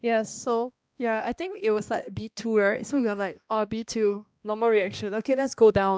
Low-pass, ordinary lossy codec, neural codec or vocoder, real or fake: none; none; codec, 16 kHz, 1 kbps, X-Codec, HuBERT features, trained on balanced general audio; fake